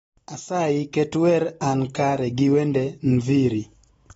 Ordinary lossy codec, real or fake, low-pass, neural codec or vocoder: AAC, 24 kbps; real; 19.8 kHz; none